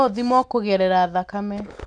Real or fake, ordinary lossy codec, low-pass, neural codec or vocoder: real; none; 9.9 kHz; none